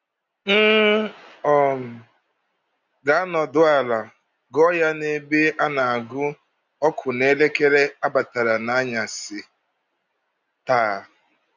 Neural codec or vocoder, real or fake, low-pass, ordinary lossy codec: none; real; 7.2 kHz; none